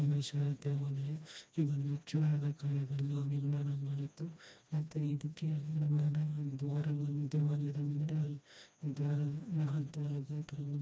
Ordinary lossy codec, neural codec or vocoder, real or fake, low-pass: none; codec, 16 kHz, 1 kbps, FreqCodec, smaller model; fake; none